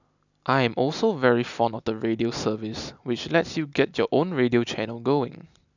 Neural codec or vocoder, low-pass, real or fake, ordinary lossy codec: none; 7.2 kHz; real; none